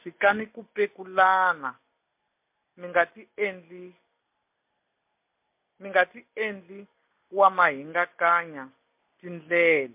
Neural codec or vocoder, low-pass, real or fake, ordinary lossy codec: none; 3.6 kHz; real; MP3, 32 kbps